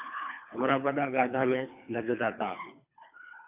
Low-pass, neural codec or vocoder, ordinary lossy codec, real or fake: 3.6 kHz; codec, 24 kHz, 3 kbps, HILCodec; AAC, 32 kbps; fake